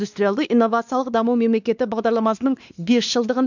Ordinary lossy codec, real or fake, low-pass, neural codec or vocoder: none; fake; 7.2 kHz; codec, 16 kHz, 2 kbps, X-Codec, WavLM features, trained on Multilingual LibriSpeech